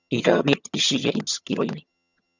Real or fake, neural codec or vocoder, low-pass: fake; vocoder, 22.05 kHz, 80 mel bands, HiFi-GAN; 7.2 kHz